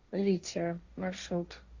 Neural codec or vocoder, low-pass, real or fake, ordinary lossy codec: codec, 16 kHz, 1.1 kbps, Voila-Tokenizer; 7.2 kHz; fake; Opus, 64 kbps